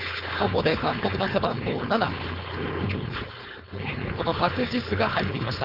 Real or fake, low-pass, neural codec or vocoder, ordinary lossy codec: fake; 5.4 kHz; codec, 16 kHz, 4.8 kbps, FACodec; none